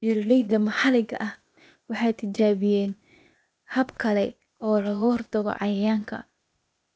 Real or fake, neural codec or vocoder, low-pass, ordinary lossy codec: fake; codec, 16 kHz, 0.8 kbps, ZipCodec; none; none